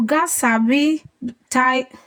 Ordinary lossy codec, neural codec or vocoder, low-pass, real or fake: none; vocoder, 48 kHz, 128 mel bands, Vocos; none; fake